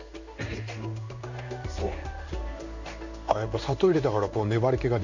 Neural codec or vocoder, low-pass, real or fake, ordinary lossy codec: codec, 16 kHz in and 24 kHz out, 1 kbps, XY-Tokenizer; 7.2 kHz; fake; none